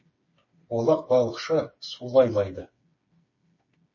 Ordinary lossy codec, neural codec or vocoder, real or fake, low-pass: MP3, 32 kbps; codec, 16 kHz, 4 kbps, FreqCodec, smaller model; fake; 7.2 kHz